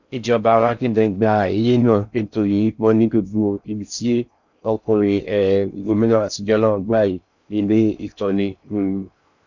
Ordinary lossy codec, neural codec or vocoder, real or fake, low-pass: none; codec, 16 kHz in and 24 kHz out, 0.6 kbps, FocalCodec, streaming, 4096 codes; fake; 7.2 kHz